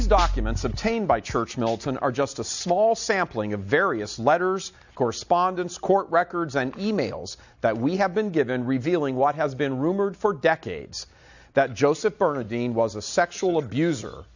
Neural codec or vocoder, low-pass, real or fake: none; 7.2 kHz; real